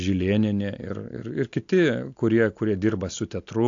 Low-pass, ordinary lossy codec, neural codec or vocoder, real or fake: 7.2 kHz; AAC, 48 kbps; none; real